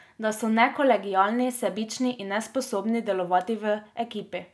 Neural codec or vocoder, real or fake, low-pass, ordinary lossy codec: none; real; none; none